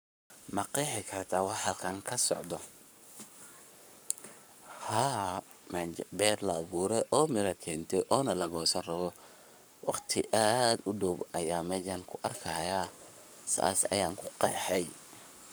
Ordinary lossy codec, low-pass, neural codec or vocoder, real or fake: none; none; codec, 44.1 kHz, 7.8 kbps, Pupu-Codec; fake